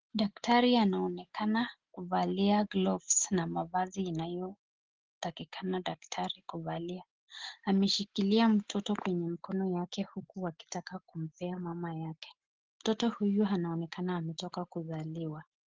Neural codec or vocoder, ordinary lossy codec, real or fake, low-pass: none; Opus, 16 kbps; real; 7.2 kHz